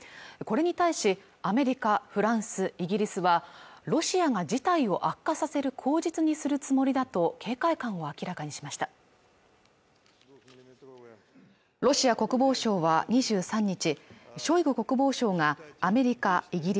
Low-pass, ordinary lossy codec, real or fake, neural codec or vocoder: none; none; real; none